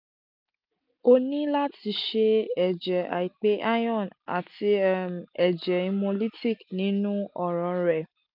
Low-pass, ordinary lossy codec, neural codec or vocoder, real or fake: 5.4 kHz; none; none; real